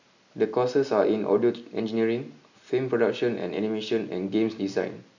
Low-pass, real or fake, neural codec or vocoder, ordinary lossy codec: 7.2 kHz; real; none; none